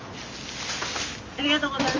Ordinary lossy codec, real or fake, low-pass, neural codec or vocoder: Opus, 32 kbps; real; 7.2 kHz; none